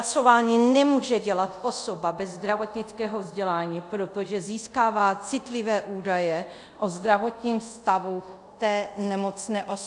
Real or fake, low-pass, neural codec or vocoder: fake; 10.8 kHz; codec, 24 kHz, 0.5 kbps, DualCodec